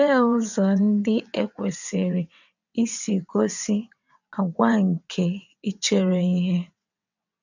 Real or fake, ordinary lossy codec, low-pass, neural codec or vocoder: fake; none; 7.2 kHz; vocoder, 22.05 kHz, 80 mel bands, WaveNeXt